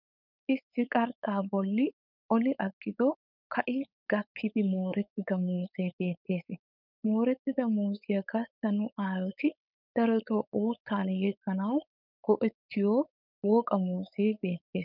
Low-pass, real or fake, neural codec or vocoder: 5.4 kHz; fake; codec, 16 kHz, 4.8 kbps, FACodec